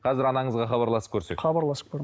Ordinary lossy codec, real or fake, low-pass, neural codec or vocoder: none; real; none; none